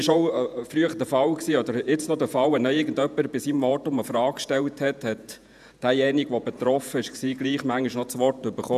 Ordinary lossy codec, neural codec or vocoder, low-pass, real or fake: none; vocoder, 48 kHz, 128 mel bands, Vocos; 14.4 kHz; fake